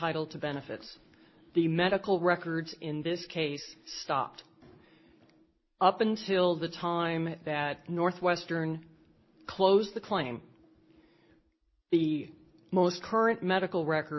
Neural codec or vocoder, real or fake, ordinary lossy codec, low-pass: none; real; MP3, 24 kbps; 7.2 kHz